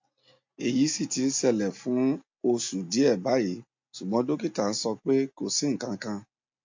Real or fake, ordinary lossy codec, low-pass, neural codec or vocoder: real; MP3, 48 kbps; 7.2 kHz; none